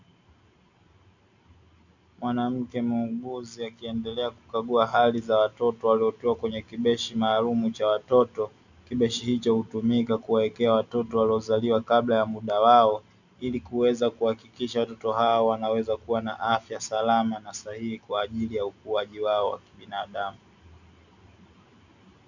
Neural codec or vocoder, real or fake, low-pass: none; real; 7.2 kHz